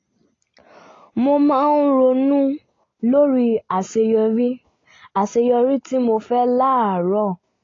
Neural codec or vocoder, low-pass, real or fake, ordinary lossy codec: none; 7.2 kHz; real; AAC, 32 kbps